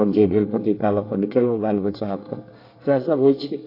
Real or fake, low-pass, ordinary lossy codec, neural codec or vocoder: fake; 5.4 kHz; AAC, 32 kbps; codec, 24 kHz, 1 kbps, SNAC